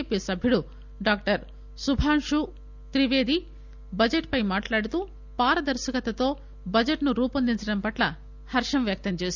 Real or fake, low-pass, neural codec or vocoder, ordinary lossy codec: real; 7.2 kHz; none; none